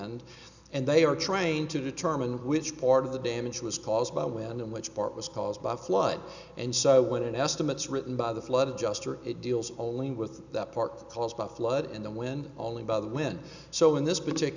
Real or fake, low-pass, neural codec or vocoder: real; 7.2 kHz; none